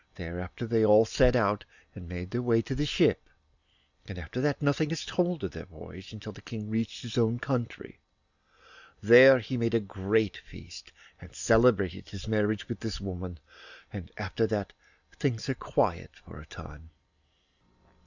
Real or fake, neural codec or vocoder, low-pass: real; none; 7.2 kHz